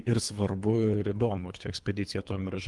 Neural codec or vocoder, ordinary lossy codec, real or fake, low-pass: codec, 24 kHz, 3 kbps, HILCodec; Opus, 24 kbps; fake; 10.8 kHz